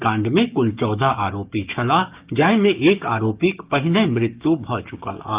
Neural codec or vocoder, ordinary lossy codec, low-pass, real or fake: codec, 16 kHz, 6 kbps, DAC; Opus, 24 kbps; 3.6 kHz; fake